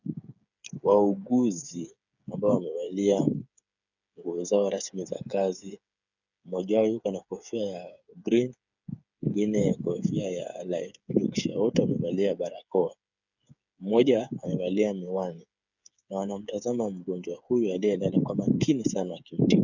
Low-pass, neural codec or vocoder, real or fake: 7.2 kHz; codec, 16 kHz, 8 kbps, FreqCodec, smaller model; fake